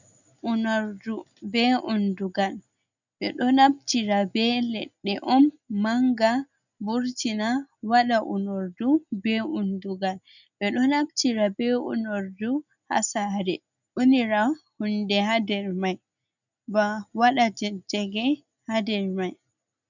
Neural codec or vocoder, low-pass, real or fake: none; 7.2 kHz; real